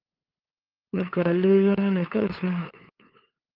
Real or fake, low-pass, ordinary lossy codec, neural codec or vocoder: fake; 5.4 kHz; Opus, 32 kbps; codec, 16 kHz, 2 kbps, FunCodec, trained on LibriTTS, 25 frames a second